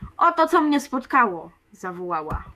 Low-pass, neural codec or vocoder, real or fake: 14.4 kHz; autoencoder, 48 kHz, 128 numbers a frame, DAC-VAE, trained on Japanese speech; fake